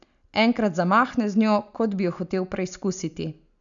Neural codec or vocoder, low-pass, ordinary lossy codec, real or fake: none; 7.2 kHz; none; real